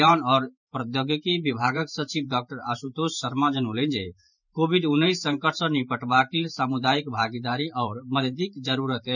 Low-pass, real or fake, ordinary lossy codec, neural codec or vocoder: 7.2 kHz; fake; none; vocoder, 44.1 kHz, 128 mel bands every 512 samples, BigVGAN v2